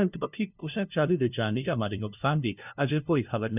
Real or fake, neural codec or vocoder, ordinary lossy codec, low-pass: fake; codec, 16 kHz, 0.5 kbps, FunCodec, trained on LibriTTS, 25 frames a second; none; 3.6 kHz